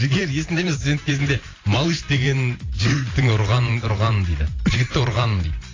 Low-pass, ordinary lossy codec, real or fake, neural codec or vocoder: 7.2 kHz; AAC, 32 kbps; fake; vocoder, 44.1 kHz, 128 mel bands every 512 samples, BigVGAN v2